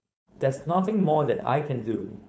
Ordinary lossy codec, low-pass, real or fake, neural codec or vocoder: none; none; fake; codec, 16 kHz, 4.8 kbps, FACodec